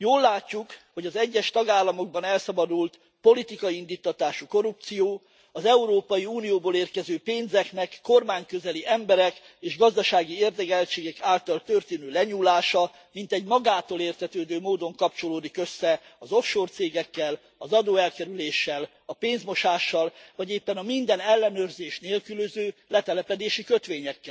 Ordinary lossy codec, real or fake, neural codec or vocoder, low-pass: none; real; none; none